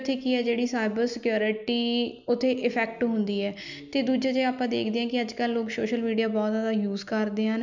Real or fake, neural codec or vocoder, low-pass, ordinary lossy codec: real; none; 7.2 kHz; none